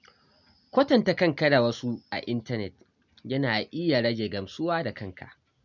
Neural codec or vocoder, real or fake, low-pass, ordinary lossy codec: none; real; 7.2 kHz; none